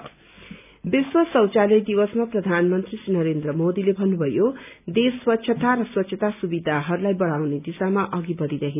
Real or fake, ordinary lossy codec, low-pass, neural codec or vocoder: real; none; 3.6 kHz; none